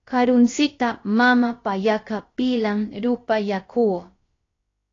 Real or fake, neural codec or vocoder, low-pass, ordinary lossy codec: fake; codec, 16 kHz, about 1 kbps, DyCAST, with the encoder's durations; 7.2 kHz; AAC, 32 kbps